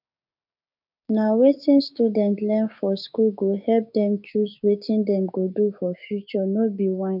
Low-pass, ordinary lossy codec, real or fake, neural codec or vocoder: 5.4 kHz; none; fake; codec, 16 kHz, 6 kbps, DAC